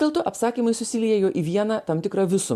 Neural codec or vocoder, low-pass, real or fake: none; 14.4 kHz; real